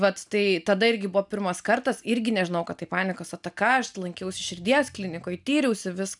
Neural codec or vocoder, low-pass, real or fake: none; 10.8 kHz; real